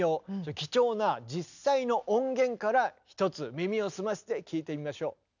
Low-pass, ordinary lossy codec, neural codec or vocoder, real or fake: 7.2 kHz; none; none; real